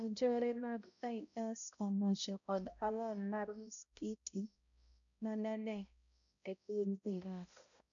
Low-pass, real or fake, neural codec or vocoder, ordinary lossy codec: 7.2 kHz; fake; codec, 16 kHz, 0.5 kbps, X-Codec, HuBERT features, trained on balanced general audio; none